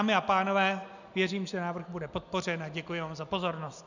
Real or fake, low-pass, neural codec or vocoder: real; 7.2 kHz; none